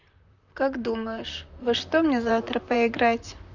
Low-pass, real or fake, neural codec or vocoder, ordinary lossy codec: 7.2 kHz; fake; vocoder, 44.1 kHz, 128 mel bands, Pupu-Vocoder; none